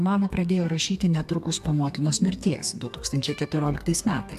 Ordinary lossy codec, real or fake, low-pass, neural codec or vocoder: AAC, 96 kbps; fake; 14.4 kHz; codec, 32 kHz, 1.9 kbps, SNAC